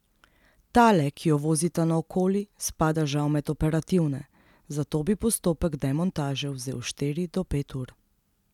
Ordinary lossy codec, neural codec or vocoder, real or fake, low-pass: none; none; real; 19.8 kHz